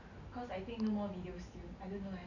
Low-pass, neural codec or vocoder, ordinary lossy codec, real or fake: 7.2 kHz; none; none; real